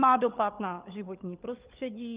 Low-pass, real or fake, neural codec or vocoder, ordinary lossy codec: 3.6 kHz; fake; codec, 16 kHz, 16 kbps, FunCodec, trained on LibriTTS, 50 frames a second; Opus, 32 kbps